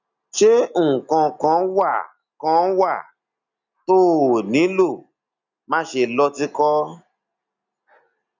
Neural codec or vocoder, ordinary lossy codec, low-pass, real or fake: none; none; 7.2 kHz; real